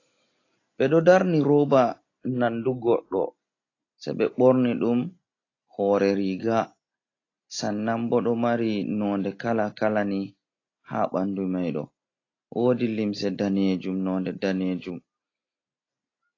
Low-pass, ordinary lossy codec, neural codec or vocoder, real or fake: 7.2 kHz; AAC, 32 kbps; none; real